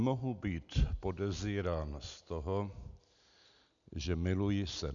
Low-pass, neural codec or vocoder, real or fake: 7.2 kHz; none; real